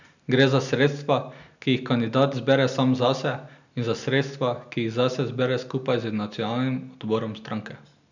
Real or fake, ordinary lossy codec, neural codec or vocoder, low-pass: real; none; none; 7.2 kHz